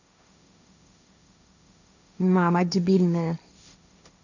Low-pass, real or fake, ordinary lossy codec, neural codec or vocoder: 7.2 kHz; fake; none; codec, 16 kHz, 1.1 kbps, Voila-Tokenizer